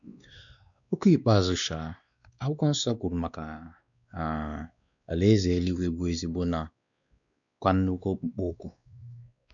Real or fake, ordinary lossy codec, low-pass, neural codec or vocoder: fake; none; 7.2 kHz; codec, 16 kHz, 2 kbps, X-Codec, WavLM features, trained on Multilingual LibriSpeech